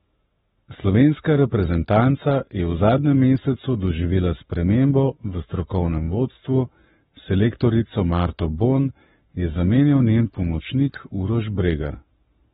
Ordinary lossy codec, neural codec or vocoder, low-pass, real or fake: AAC, 16 kbps; codec, 44.1 kHz, 7.8 kbps, Pupu-Codec; 19.8 kHz; fake